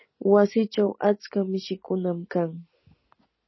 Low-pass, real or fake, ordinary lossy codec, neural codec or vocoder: 7.2 kHz; real; MP3, 24 kbps; none